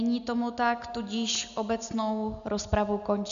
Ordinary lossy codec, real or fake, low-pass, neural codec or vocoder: AAC, 96 kbps; real; 7.2 kHz; none